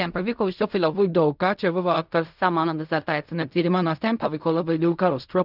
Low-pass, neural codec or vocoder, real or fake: 5.4 kHz; codec, 16 kHz in and 24 kHz out, 0.4 kbps, LongCat-Audio-Codec, fine tuned four codebook decoder; fake